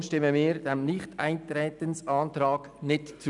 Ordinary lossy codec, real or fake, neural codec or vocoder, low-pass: none; real; none; 10.8 kHz